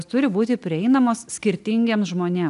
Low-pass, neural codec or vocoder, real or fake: 10.8 kHz; none; real